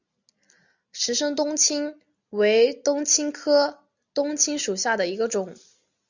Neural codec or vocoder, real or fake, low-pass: none; real; 7.2 kHz